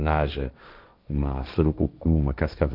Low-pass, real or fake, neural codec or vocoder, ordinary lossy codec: 5.4 kHz; fake; codec, 16 kHz, 1.1 kbps, Voila-Tokenizer; none